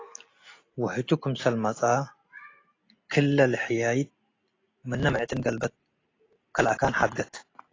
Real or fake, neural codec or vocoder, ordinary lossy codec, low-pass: real; none; AAC, 32 kbps; 7.2 kHz